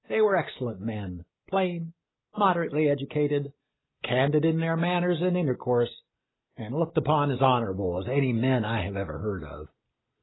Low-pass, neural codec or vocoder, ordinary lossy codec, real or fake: 7.2 kHz; none; AAC, 16 kbps; real